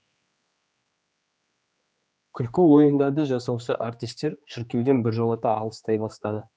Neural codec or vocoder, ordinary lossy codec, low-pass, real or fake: codec, 16 kHz, 2 kbps, X-Codec, HuBERT features, trained on general audio; none; none; fake